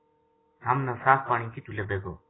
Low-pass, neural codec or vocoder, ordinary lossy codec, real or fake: 7.2 kHz; none; AAC, 16 kbps; real